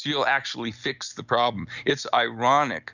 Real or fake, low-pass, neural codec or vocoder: real; 7.2 kHz; none